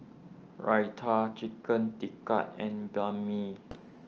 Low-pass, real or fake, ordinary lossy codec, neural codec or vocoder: 7.2 kHz; real; Opus, 24 kbps; none